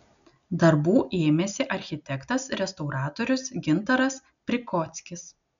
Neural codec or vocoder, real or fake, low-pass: none; real; 7.2 kHz